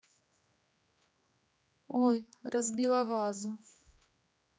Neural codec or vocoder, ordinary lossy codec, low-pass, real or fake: codec, 16 kHz, 2 kbps, X-Codec, HuBERT features, trained on general audio; none; none; fake